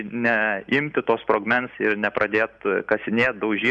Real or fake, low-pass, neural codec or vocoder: real; 10.8 kHz; none